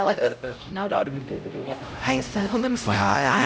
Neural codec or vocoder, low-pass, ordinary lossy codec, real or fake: codec, 16 kHz, 0.5 kbps, X-Codec, HuBERT features, trained on LibriSpeech; none; none; fake